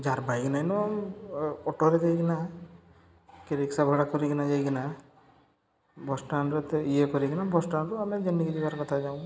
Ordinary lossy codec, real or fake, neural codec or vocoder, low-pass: none; real; none; none